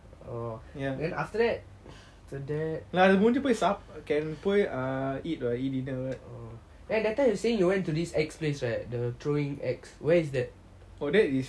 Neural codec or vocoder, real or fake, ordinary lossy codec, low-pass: none; real; none; none